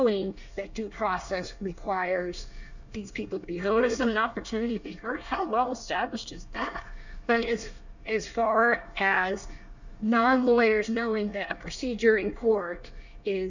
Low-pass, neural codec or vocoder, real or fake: 7.2 kHz; codec, 24 kHz, 1 kbps, SNAC; fake